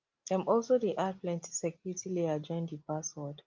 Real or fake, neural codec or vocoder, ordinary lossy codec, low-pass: real; none; Opus, 24 kbps; 7.2 kHz